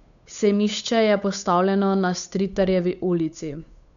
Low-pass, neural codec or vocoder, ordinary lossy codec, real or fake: 7.2 kHz; codec, 16 kHz, 8 kbps, FunCodec, trained on Chinese and English, 25 frames a second; none; fake